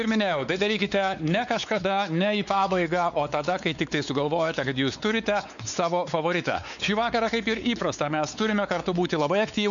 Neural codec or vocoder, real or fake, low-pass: codec, 16 kHz, 4 kbps, X-Codec, WavLM features, trained on Multilingual LibriSpeech; fake; 7.2 kHz